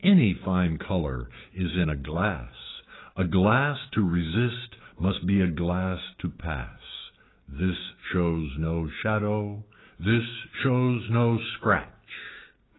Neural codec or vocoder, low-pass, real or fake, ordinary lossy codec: codec, 16 kHz, 6 kbps, DAC; 7.2 kHz; fake; AAC, 16 kbps